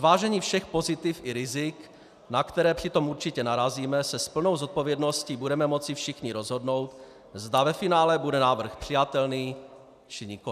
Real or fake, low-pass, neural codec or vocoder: real; 14.4 kHz; none